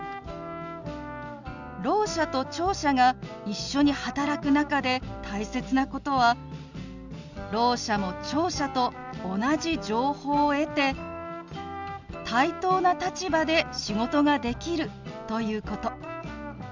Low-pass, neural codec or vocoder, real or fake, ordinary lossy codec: 7.2 kHz; none; real; none